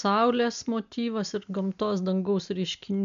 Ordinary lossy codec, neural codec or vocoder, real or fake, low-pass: MP3, 64 kbps; none; real; 7.2 kHz